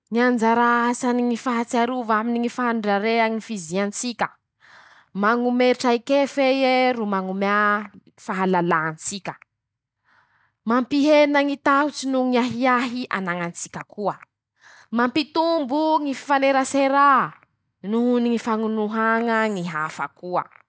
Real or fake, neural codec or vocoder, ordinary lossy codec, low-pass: real; none; none; none